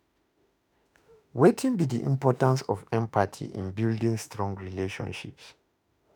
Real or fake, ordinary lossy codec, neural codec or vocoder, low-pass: fake; none; autoencoder, 48 kHz, 32 numbers a frame, DAC-VAE, trained on Japanese speech; none